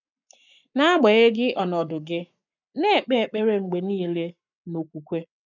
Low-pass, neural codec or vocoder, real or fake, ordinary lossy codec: 7.2 kHz; codec, 44.1 kHz, 7.8 kbps, Pupu-Codec; fake; none